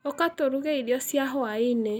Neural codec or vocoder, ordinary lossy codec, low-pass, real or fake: none; none; 19.8 kHz; real